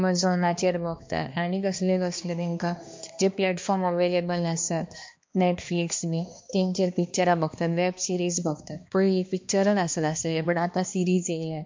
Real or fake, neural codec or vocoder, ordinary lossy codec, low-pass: fake; codec, 16 kHz, 2 kbps, X-Codec, HuBERT features, trained on balanced general audio; MP3, 48 kbps; 7.2 kHz